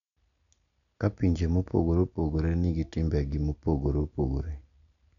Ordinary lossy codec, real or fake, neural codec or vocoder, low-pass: none; real; none; 7.2 kHz